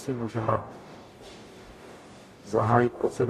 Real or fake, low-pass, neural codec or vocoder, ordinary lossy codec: fake; 14.4 kHz; codec, 44.1 kHz, 0.9 kbps, DAC; AAC, 48 kbps